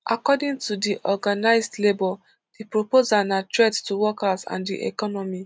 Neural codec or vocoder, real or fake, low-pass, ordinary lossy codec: none; real; none; none